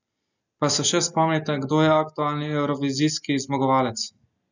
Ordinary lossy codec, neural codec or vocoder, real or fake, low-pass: none; none; real; 7.2 kHz